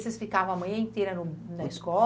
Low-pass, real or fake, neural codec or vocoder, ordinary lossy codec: none; real; none; none